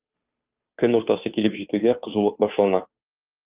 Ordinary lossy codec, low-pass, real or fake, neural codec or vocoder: Opus, 32 kbps; 3.6 kHz; fake; codec, 16 kHz, 2 kbps, FunCodec, trained on Chinese and English, 25 frames a second